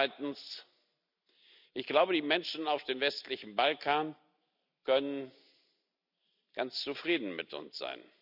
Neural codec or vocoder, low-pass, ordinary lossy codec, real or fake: none; 5.4 kHz; none; real